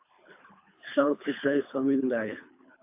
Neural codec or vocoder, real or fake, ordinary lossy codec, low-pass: codec, 24 kHz, 3 kbps, HILCodec; fake; AAC, 32 kbps; 3.6 kHz